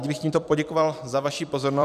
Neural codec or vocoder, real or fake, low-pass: vocoder, 44.1 kHz, 128 mel bands every 256 samples, BigVGAN v2; fake; 14.4 kHz